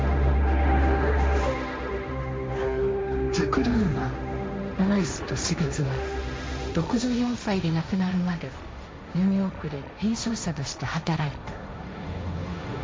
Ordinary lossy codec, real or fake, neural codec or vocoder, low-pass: none; fake; codec, 16 kHz, 1.1 kbps, Voila-Tokenizer; none